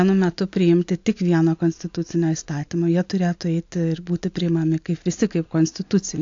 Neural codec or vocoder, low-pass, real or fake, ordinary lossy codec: none; 7.2 kHz; real; AAC, 48 kbps